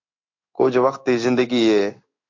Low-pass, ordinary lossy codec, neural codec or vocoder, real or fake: 7.2 kHz; MP3, 48 kbps; codec, 16 kHz in and 24 kHz out, 1 kbps, XY-Tokenizer; fake